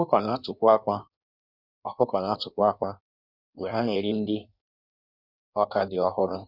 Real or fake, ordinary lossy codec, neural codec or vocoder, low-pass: fake; none; codec, 16 kHz in and 24 kHz out, 1.1 kbps, FireRedTTS-2 codec; 5.4 kHz